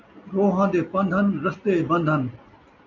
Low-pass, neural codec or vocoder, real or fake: 7.2 kHz; none; real